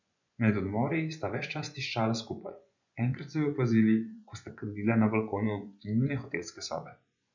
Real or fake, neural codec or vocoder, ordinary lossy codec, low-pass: fake; vocoder, 24 kHz, 100 mel bands, Vocos; none; 7.2 kHz